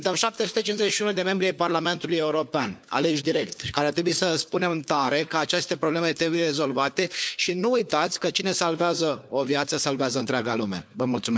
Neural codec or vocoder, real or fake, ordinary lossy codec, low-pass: codec, 16 kHz, 4 kbps, FunCodec, trained on LibriTTS, 50 frames a second; fake; none; none